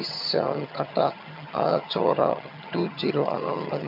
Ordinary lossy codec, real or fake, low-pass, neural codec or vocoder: MP3, 48 kbps; fake; 5.4 kHz; vocoder, 22.05 kHz, 80 mel bands, HiFi-GAN